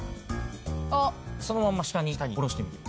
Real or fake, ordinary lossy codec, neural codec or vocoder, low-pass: real; none; none; none